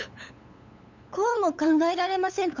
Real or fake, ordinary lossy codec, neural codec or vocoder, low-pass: fake; none; codec, 16 kHz, 8 kbps, FunCodec, trained on LibriTTS, 25 frames a second; 7.2 kHz